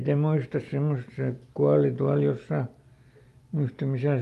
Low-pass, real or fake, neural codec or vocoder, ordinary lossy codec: 14.4 kHz; real; none; Opus, 32 kbps